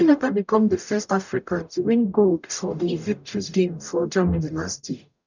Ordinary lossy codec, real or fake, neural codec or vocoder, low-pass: none; fake; codec, 44.1 kHz, 0.9 kbps, DAC; 7.2 kHz